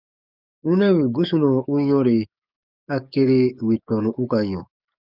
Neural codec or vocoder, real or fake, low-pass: codec, 44.1 kHz, 7.8 kbps, DAC; fake; 5.4 kHz